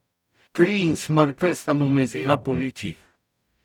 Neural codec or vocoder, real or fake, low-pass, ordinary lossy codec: codec, 44.1 kHz, 0.9 kbps, DAC; fake; 19.8 kHz; none